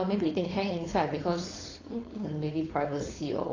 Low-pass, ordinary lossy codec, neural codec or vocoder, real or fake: 7.2 kHz; AAC, 32 kbps; codec, 16 kHz, 4.8 kbps, FACodec; fake